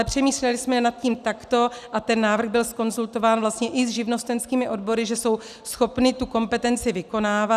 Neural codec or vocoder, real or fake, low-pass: none; real; 14.4 kHz